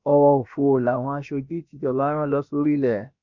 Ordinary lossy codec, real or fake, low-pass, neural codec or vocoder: none; fake; 7.2 kHz; codec, 16 kHz, about 1 kbps, DyCAST, with the encoder's durations